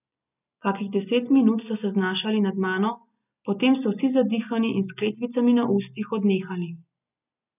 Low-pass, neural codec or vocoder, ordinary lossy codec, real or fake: 3.6 kHz; none; none; real